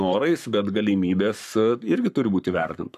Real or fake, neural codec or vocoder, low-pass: fake; codec, 44.1 kHz, 7.8 kbps, Pupu-Codec; 14.4 kHz